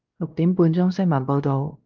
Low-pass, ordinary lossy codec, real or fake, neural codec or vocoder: 7.2 kHz; Opus, 32 kbps; fake; codec, 16 kHz, 1 kbps, X-Codec, WavLM features, trained on Multilingual LibriSpeech